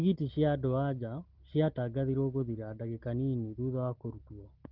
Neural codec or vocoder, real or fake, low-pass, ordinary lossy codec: none; real; 5.4 kHz; Opus, 16 kbps